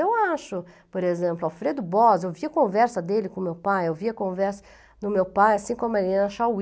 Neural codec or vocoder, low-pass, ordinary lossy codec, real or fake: none; none; none; real